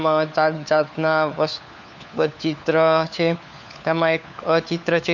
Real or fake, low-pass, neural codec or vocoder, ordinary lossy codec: fake; 7.2 kHz; codec, 16 kHz, 4 kbps, FunCodec, trained on LibriTTS, 50 frames a second; none